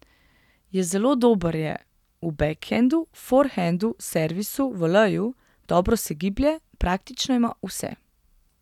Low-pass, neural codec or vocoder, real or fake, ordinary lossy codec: 19.8 kHz; none; real; none